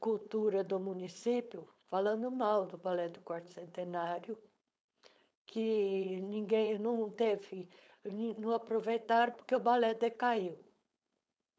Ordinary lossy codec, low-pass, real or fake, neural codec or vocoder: none; none; fake; codec, 16 kHz, 4.8 kbps, FACodec